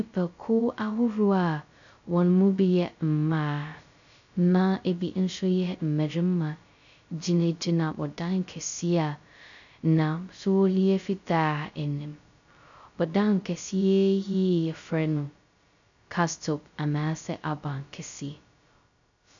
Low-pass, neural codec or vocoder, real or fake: 7.2 kHz; codec, 16 kHz, 0.2 kbps, FocalCodec; fake